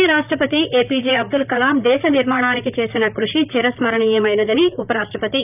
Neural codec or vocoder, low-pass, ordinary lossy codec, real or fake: vocoder, 44.1 kHz, 128 mel bands, Pupu-Vocoder; 3.6 kHz; none; fake